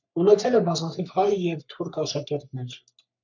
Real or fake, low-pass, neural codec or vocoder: fake; 7.2 kHz; codec, 44.1 kHz, 3.4 kbps, Pupu-Codec